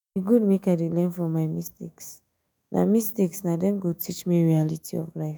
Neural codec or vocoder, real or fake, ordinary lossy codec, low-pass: autoencoder, 48 kHz, 128 numbers a frame, DAC-VAE, trained on Japanese speech; fake; none; none